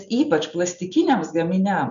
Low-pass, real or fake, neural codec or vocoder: 7.2 kHz; real; none